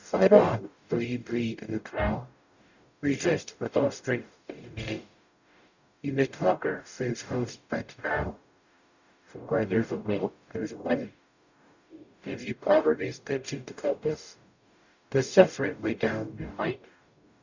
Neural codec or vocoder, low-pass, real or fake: codec, 44.1 kHz, 0.9 kbps, DAC; 7.2 kHz; fake